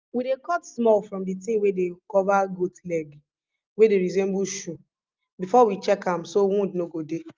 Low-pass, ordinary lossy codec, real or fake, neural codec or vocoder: 7.2 kHz; Opus, 24 kbps; real; none